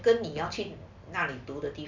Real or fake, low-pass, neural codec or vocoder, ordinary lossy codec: real; 7.2 kHz; none; none